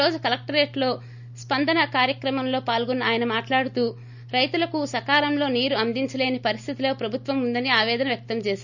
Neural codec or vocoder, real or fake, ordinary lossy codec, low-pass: none; real; none; 7.2 kHz